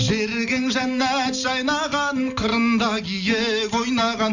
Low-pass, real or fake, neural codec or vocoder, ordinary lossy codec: 7.2 kHz; real; none; none